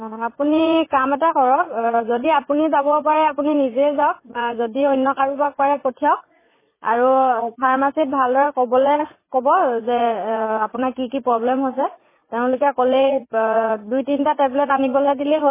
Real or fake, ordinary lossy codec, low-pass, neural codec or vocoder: fake; MP3, 16 kbps; 3.6 kHz; vocoder, 44.1 kHz, 80 mel bands, Vocos